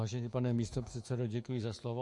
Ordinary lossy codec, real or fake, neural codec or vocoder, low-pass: MP3, 48 kbps; fake; autoencoder, 48 kHz, 128 numbers a frame, DAC-VAE, trained on Japanese speech; 10.8 kHz